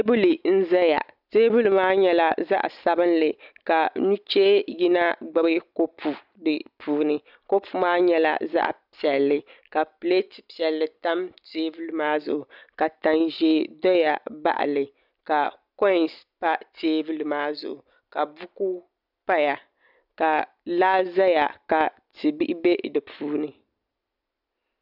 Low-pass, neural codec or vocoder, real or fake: 5.4 kHz; none; real